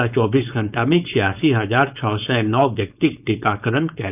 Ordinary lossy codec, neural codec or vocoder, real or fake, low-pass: none; codec, 16 kHz, 4.8 kbps, FACodec; fake; 3.6 kHz